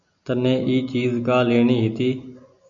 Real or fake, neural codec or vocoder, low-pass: real; none; 7.2 kHz